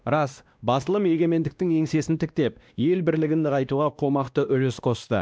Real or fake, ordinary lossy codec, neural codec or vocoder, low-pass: fake; none; codec, 16 kHz, 1 kbps, X-Codec, WavLM features, trained on Multilingual LibriSpeech; none